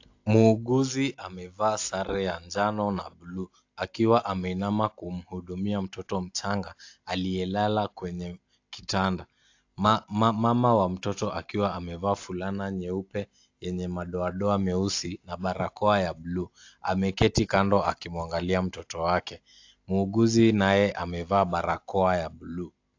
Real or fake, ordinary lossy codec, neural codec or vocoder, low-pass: real; AAC, 48 kbps; none; 7.2 kHz